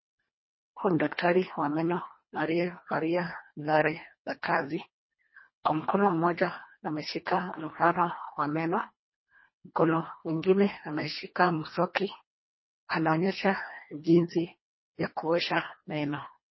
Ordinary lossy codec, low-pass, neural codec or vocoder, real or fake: MP3, 24 kbps; 7.2 kHz; codec, 24 kHz, 1.5 kbps, HILCodec; fake